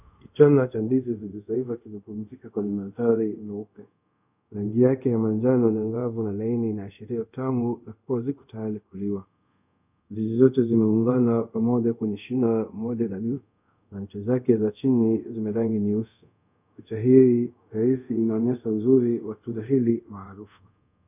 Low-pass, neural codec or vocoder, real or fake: 3.6 kHz; codec, 24 kHz, 0.5 kbps, DualCodec; fake